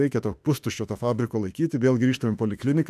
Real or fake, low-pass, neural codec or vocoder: fake; 14.4 kHz; autoencoder, 48 kHz, 32 numbers a frame, DAC-VAE, trained on Japanese speech